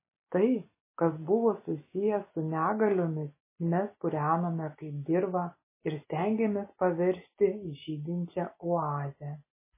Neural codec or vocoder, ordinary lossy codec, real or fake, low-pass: none; MP3, 16 kbps; real; 3.6 kHz